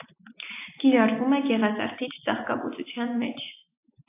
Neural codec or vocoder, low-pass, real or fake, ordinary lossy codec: none; 3.6 kHz; real; AAC, 24 kbps